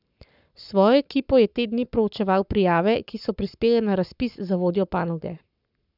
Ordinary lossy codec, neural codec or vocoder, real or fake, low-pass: none; codec, 44.1 kHz, 7.8 kbps, Pupu-Codec; fake; 5.4 kHz